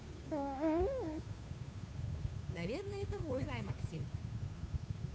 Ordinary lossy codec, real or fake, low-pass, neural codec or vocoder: none; fake; none; codec, 16 kHz, 2 kbps, FunCodec, trained on Chinese and English, 25 frames a second